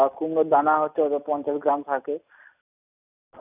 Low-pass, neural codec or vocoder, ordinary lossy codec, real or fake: 3.6 kHz; none; none; real